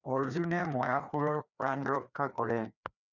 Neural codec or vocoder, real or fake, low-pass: codec, 16 kHz, 2 kbps, FreqCodec, larger model; fake; 7.2 kHz